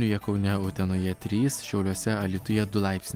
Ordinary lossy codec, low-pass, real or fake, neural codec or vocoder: Opus, 32 kbps; 19.8 kHz; real; none